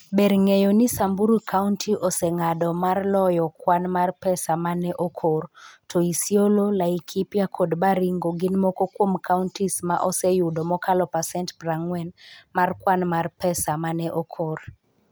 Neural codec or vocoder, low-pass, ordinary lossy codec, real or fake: none; none; none; real